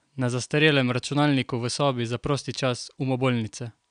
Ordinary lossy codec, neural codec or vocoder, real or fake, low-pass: none; none; real; 9.9 kHz